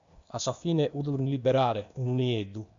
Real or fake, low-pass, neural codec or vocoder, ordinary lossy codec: fake; 7.2 kHz; codec, 16 kHz, 0.8 kbps, ZipCodec; AAC, 64 kbps